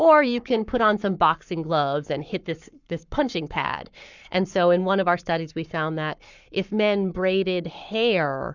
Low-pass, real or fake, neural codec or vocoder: 7.2 kHz; fake; codec, 44.1 kHz, 7.8 kbps, Pupu-Codec